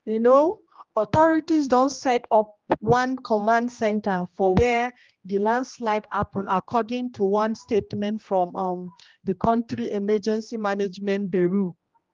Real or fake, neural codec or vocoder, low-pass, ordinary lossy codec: fake; codec, 16 kHz, 1 kbps, X-Codec, HuBERT features, trained on general audio; 7.2 kHz; Opus, 32 kbps